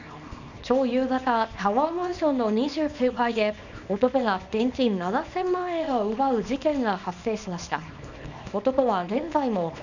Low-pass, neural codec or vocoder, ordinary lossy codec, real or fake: 7.2 kHz; codec, 24 kHz, 0.9 kbps, WavTokenizer, small release; none; fake